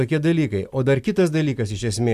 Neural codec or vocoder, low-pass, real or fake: none; 14.4 kHz; real